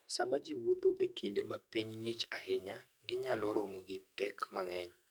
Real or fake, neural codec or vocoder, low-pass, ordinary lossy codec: fake; codec, 44.1 kHz, 2.6 kbps, SNAC; none; none